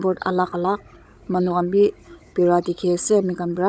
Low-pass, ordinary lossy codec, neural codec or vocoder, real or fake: none; none; codec, 16 kHz, 16 kbps, FunCodec, trained on Chinese and English, 50 frames a second; fake